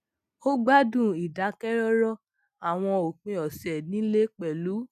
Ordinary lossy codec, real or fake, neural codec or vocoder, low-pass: none; real; none; 14.4 kHz